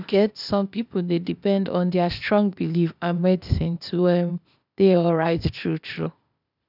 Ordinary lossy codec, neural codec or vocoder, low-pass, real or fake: none; codec, 16 kHz, 0.8 kbps, ZipCodec; 5.4 kHz; fake